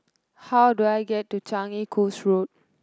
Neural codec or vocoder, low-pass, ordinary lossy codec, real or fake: none; none; none; real